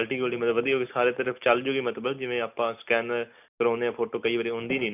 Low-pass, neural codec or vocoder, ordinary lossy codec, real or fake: 3.6 kHz; none; none; real